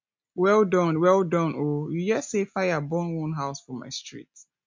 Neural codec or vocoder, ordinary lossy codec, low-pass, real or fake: none; MP3, 64 kbps; 7.2 kHz; real